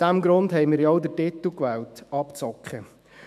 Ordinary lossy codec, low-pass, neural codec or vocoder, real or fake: MP3, 96 kbps; 14.4 kHz; autoencoder, 48 kHz, 128 numbers a frame, DAC-VAE, trained on Japanese speech; fake